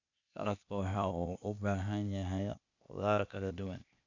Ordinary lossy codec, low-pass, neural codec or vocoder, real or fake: none; 7.2 kHz; codec, 16 kHz, 0.8 kbps, ZipCodec; fake